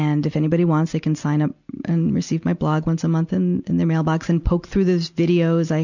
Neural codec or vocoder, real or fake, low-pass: none; real; 7.2 kHz